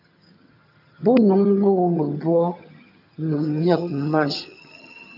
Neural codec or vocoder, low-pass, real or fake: vocoder, 22.05 kHz, 80 mel bands, HiFi-GAN; 5.4 kHz; fake